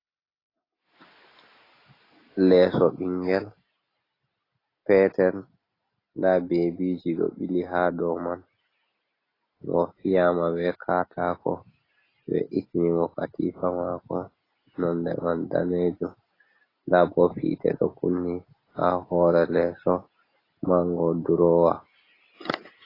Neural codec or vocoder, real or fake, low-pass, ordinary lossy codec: none; real; 5.4 kHz; AAC, 24 kbps